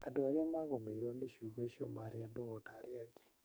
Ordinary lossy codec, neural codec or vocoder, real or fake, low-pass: none; codec, 44.1 kHz, 2.6 kbps, SNAC; fake; none